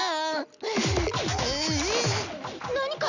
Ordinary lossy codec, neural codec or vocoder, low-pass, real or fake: none; none; 7.2 kHz; real